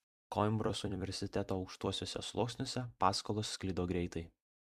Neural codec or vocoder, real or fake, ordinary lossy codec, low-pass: vocoder, 44.1 kHz, 128 mel bands every 256 samples, BigVGAN v2; fake; MP3, 96 kbps; 14.4 kHz